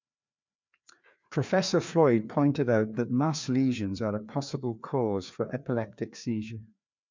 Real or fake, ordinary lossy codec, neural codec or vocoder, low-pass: fake; MP3, 64 kbps; codec, 16 kHz, 2 kbps, FreqCodec, larger model; 7.2 kHz